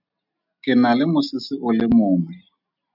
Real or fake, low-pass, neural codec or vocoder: real; 5.4 kHz; none